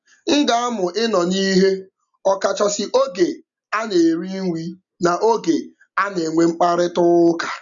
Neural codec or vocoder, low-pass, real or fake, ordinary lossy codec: none; 7.2 kHz; real; none